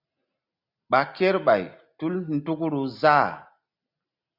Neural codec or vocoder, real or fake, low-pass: none; real; 5.4 kHz